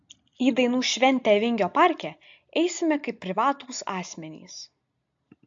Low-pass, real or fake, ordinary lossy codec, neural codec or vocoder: 7.2 kHz; real; AAC, 64 kbps; none